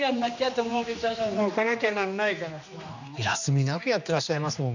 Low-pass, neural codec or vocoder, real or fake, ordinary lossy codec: 7.2 kHz; codec, 16 kHz, 2 kbps, X-Codec, HuBERT features, trained on general audio; fake; none